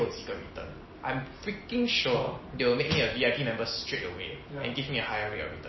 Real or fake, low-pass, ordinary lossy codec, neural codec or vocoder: real; 7.2 kHz; MP3, 24 kbps; none